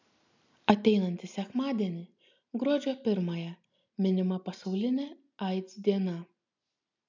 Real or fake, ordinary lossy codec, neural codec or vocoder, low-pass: real; MP3, 64 kbps; none; 7.2 kHz